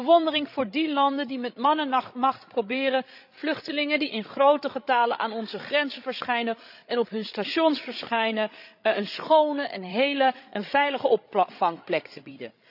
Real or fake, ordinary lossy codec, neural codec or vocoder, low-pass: fake; none; codec, 16 kHz, 16 kbps, FreqCodec, larger model; 5.4 kHz